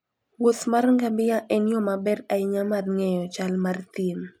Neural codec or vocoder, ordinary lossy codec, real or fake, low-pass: none; none; real; 19.8 kHz